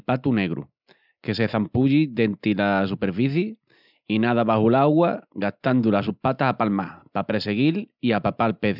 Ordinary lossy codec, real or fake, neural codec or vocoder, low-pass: none; real; none; 5.4 kHz